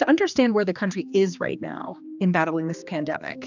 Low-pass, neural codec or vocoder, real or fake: 7.2 kHz; codec, 16 kHz, 2 kbps, X-Codec, HuBERT features, trained on general audio; fake